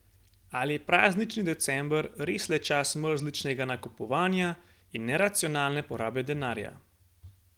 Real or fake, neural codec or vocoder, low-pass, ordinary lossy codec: real; none; 19.8 kHz; Opus, 24 kbps